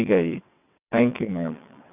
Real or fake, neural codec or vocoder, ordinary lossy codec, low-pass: fake; vocoder, 22.05 kHz, 80 mel bands, WaveNeXt; none; 3.6 kHz